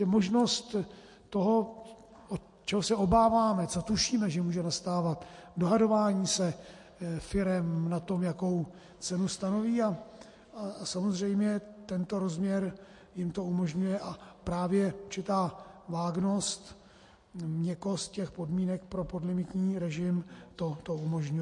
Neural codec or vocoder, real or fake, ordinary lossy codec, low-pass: none; real; MP3, 48 kbps; 10.8 kHz